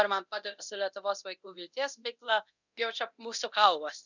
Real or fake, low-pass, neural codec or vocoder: fake; 7.2 kHz; codec, 24 kHz, 0.9 kbps, DualCodec